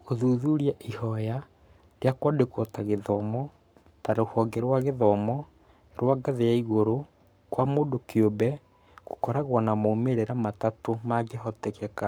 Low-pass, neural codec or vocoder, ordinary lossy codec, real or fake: none; codec, 44.1 kHz, 7.8 kbps, Pupu-Codec; none; fake